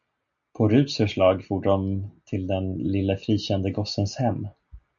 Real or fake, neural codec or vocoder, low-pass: real; none; 7.2 kHz